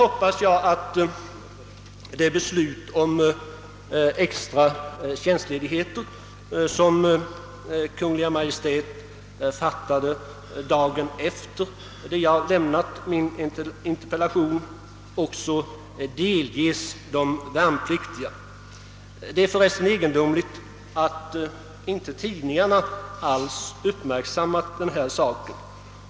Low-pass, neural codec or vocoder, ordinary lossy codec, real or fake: none; none; none; real